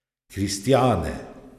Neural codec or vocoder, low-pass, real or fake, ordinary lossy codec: none; 14.4 kHz; real; none